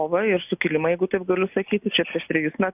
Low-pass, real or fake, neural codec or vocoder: 3.6 kHz; real; none